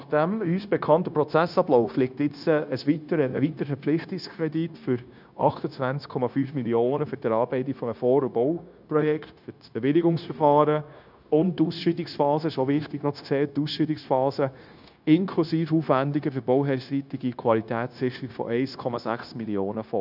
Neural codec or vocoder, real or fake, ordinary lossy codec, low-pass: codec, 16 kHz, 0.9 kbps, LongCat-Audio-Codec; fake; none; 5.4 kHz